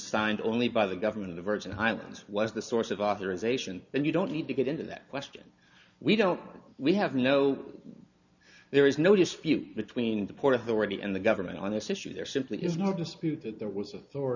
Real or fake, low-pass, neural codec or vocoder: real; 7.2 kHz; none